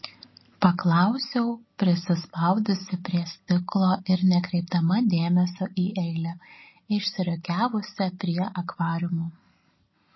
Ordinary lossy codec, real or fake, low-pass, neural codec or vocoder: MP3, 24 kbps; real; 7.2 kHz; none